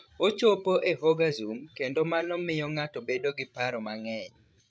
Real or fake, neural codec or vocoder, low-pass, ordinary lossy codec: fake; codec, 16 kHz, 16 kbps, FreqCodec, larger model; none; none